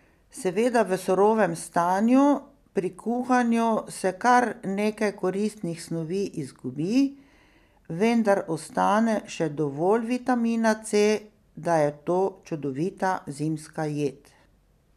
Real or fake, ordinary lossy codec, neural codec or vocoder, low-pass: real; MP3, 96 kbps; none; 14.4 kHz